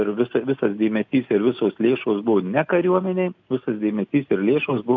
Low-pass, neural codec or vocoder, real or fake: 7.2 kHz; none; real